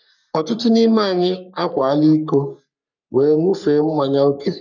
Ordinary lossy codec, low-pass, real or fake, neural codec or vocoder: none; 7.2 kHz; fake; codec, 44.1 kHz, 3.4 kbps, Pupu-Codec